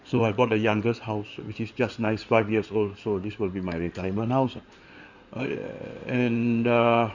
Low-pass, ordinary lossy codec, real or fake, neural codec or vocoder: 7.2 kHz; none; fake; codec, 16 kHz in and 24 kHz out, 2.2 kbps, FireRedTTS-2 codec